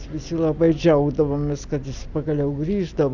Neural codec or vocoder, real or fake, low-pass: none; real; 7.2 kHz